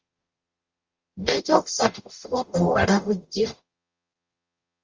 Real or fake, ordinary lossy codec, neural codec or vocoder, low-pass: fake; Opus, 32 kbps; codec, 44.1 kHz, 0.9 kbps, DAC; 7.2 kHz